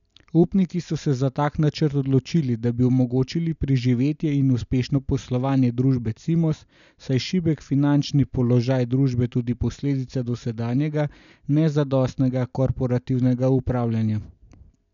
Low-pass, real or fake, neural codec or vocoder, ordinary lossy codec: 7.2 kHz; real; none; none